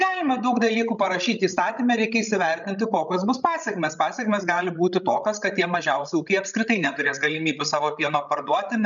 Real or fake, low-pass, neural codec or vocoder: fake; 7.2 kHz; codec, 16 kHz, 16 kbps, FreqCodec, larger model